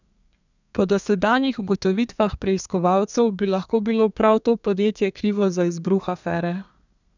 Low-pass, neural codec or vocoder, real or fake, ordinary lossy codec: 7.2 kHz; codec, 32 kHz, 1.9 kbps, SNAC; fake; none